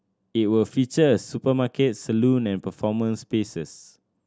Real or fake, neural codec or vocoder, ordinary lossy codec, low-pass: real; none; none; none